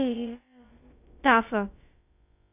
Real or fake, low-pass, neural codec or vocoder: fake; 3.6 kHz; codec, 16 kHz, about 1 kbps, DyCAST, with the encoder's durations